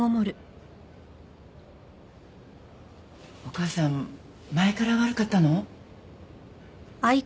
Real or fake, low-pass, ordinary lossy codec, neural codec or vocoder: real; none; none; none